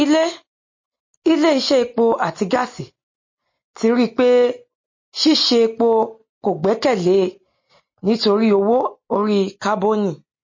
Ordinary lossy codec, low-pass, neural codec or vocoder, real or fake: MP3, 32 kbps; 7.2 kHz; none; real